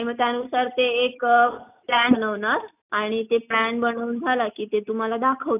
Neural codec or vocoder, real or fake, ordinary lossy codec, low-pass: none; real; none; 3.6 kHz